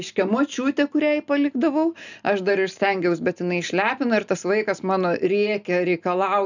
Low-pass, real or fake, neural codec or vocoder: 7.2 kHz; real; none